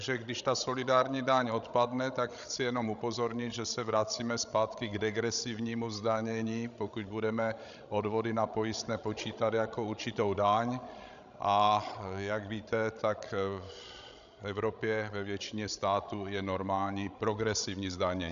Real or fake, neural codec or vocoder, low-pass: fake; codec, 16 kHz, 16 kbps, FreqCodec, larger model; 7.2 kHz